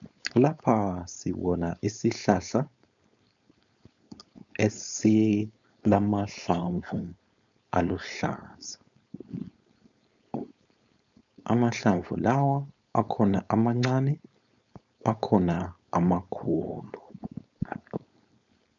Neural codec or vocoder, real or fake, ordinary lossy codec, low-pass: codec, 16 kHz, 4.8 kbps, FACodec; fake; MP3, 96 kbps; 7.2 kHz